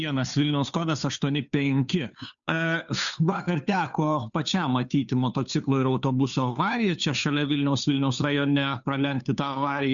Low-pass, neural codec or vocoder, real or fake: 7.2 kHz; codec, 16 kHz, 2 kbps, FunCodec, trained on Chinese and English, 25 frames a second; fake